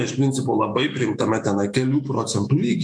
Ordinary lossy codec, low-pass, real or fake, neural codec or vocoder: MP3, 64 kbps; 9.9 kHz; fake; autoencoder, 48 kHz, 128 numbers a frame, DAC-VAE, trained on Japanese speech